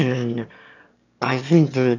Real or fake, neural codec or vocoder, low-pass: fake; autoencoder, 22.05 kHz, a latent of 192 numbers a frame, VITS, trained on one speaker; 7.2 kHz